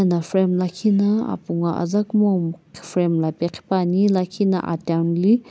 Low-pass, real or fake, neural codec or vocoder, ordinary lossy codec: none; real; none; none